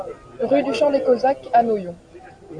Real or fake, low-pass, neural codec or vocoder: real; 9.9 kHz; none